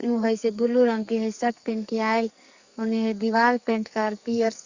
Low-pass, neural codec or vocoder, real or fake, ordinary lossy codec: 7.2 kHz; codec, 44.1 kHz, 2.6 kbps, SNAC; fake; Opus, 64 kbps